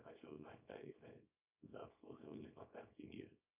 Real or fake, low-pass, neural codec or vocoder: fake; 3.6 kHz; codec, 24 kHz, 0.9 kbps, WavTokenizer, small release